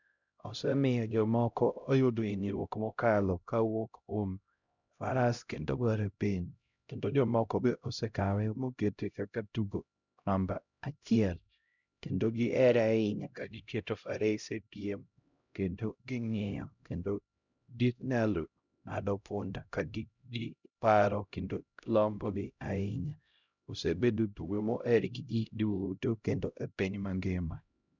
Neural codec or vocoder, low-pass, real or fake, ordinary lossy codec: codec, 16 kHz, 0.5 kbps, X-Codec, HuBERT features, trained on LibriSpeech; 7.2 kHz; fake; none